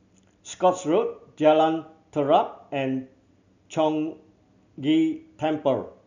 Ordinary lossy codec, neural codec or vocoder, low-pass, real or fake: none; none; 7.2 kHz; real